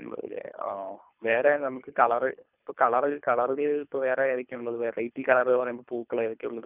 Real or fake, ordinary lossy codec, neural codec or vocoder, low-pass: fake; none; codec, 24 kHz, 3 kbps, HILCodec; 3.6 kHz